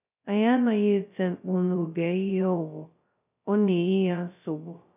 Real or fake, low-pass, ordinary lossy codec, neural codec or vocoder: fake; 3.6 kHz; none; codec, 16 kHz, 0.2 kbps, FocalCodec